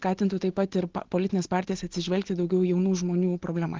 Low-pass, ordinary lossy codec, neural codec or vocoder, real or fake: 7.2 kHz; Opus, 16 kbps; none; real